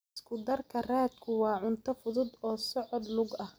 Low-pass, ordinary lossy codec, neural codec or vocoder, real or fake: none; none; none; real